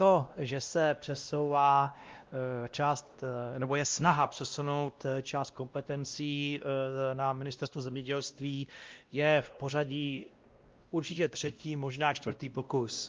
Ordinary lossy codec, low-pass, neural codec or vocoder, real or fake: Opus, 32 kbps; 7.2 kHz; codec, 16 kHz, 1 kbps, X-Codec, WavLM features, trained on Multilingual LibriSpeech; fake